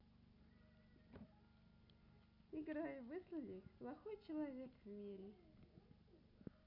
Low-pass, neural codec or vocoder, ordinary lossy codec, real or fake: 5.4 kHz; none; none; real